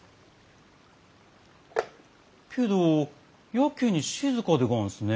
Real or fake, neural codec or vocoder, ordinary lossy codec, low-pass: real; none; none; none